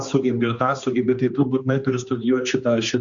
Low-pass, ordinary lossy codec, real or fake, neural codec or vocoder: 7.2 kHz; Opus, 64 kbps; fake; codec, 16 kHz, 2 kbps, X-Codec, HuBERT features, trained on general audio